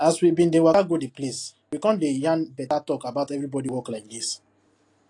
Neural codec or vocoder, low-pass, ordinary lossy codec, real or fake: none; 10.8 kHz; AAC, 48 kbps; real